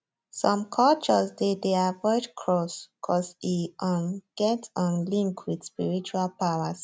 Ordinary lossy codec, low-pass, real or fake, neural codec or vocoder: none; none; real; none